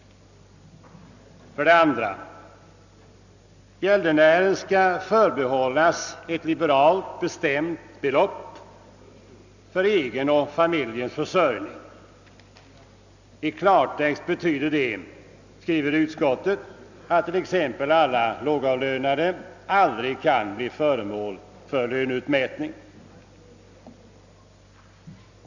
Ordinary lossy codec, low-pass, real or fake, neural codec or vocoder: none; 7.2 kHz; real; none